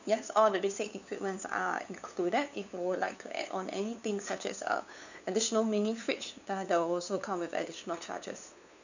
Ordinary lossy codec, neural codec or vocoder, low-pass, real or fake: none; codec, 16 kHz, 2 kbps, FunCodec, trained on LibriTTS, 25 frames a second; 7.2 kHz; fake